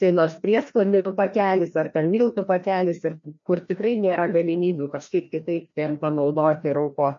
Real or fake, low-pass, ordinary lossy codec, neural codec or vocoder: fake; 7.2 kHz; MP3, 48 kbps; codec, 16 kHz, 1 kbps, FreqCodec, larger model